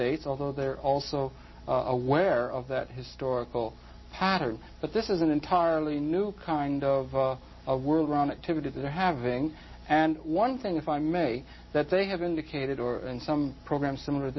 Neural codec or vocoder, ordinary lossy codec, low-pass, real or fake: none; MP3, 24 kbps; 7.2 kHz; real